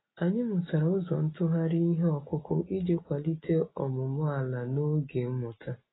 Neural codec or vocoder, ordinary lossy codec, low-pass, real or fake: none; AAC, 16 kbps; 7.2 kHz; real